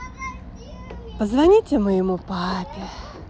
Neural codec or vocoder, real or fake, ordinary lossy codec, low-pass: none; real; none; none